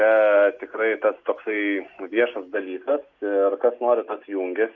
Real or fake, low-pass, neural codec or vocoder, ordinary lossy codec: real; 7.2 kHz; none; Opus, 64 kbps